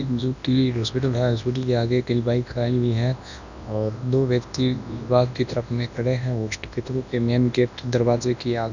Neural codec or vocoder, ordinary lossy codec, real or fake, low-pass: codec, 24 kHz, 0.9 kbps, WavTokenizer, large speech release; none; fake; 7.2 kHz